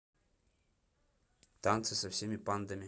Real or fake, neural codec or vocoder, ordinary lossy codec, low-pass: real; none; none; none